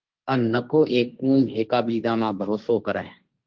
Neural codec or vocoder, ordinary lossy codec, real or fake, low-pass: codec, 16 kHz, 1.1 kbps, Voila-Tokenizer; Opus, 32 kbps; fake; 7.2 kHz